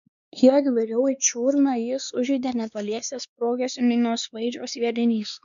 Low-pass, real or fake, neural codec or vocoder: 7.2 kHz; fake; codec, 16 kHz, 2 kbps, X-Codec, WavLM features, trained on Multilingual LibriSpeech